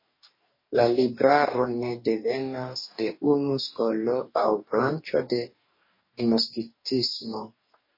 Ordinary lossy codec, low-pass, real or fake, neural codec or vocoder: MP3, 24 kbps; 5.4 kHz; fake; codec, 44.1 kHz, 2.6 kbps, DAC